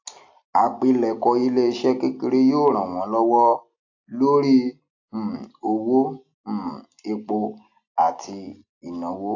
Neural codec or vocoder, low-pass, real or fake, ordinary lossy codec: none; 7.2 kHz; real; AAC, 48 kbps